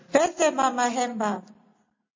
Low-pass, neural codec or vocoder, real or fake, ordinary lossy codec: 7.2 kHz; none; real; MP3, 32 kbps